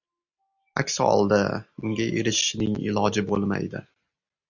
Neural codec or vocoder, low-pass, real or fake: none; 7.2 kHz; real